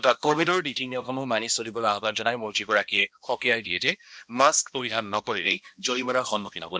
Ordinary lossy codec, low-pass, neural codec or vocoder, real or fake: none; none; codec, 16 kHz, 1 kbps, X-Codec, HuBERT features, trained on balanced general audio; fake